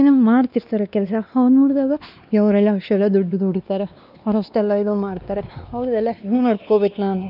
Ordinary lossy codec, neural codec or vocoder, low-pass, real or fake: none; codec, 16 kHz, 2 kbps, X-Codec, WavLM features, trained on Multilingual LibriSpeech; 5.4 kHz; fake